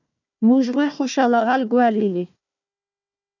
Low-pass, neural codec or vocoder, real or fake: 7.2 kHz; codec, 16 kHz, 1 kbps, FunCodec, trained on Chinese and English, 50 frames a second; fake